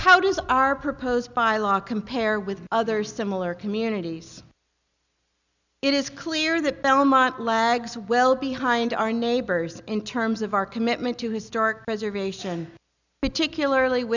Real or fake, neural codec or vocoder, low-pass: real; none; 7.2 kHz